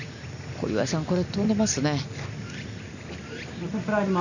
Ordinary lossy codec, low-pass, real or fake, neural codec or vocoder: none; 7.2 kHz; real; none